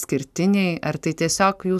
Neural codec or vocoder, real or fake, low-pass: none; real; 14.4 kHz